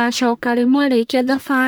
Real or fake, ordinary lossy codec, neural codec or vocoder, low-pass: fake; none; codec, 44.1 kHz, 1.7 kbps, Pupu-Codec; none